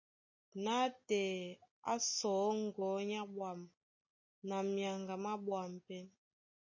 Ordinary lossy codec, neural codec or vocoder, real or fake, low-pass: MP3, 32 kbps; none; real; 7.2 kHz